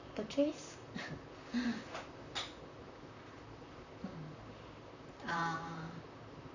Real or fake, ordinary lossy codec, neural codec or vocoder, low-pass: fake; none; vocoder, 44.1 kHz, 128 mel bands, Pupu-Vocoder; 7.2 kHz